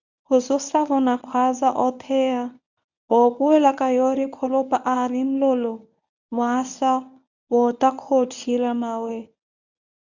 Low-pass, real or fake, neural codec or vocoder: 7.2 kHz; fake; codec, 24 kHz, 0.9 kbps, WavTokenizer, medium speech release version 1